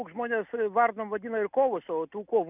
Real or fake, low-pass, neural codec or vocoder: real; 3.6 kHz; none